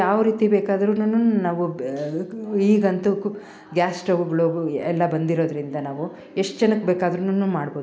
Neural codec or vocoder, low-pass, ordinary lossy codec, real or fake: none; none; none; real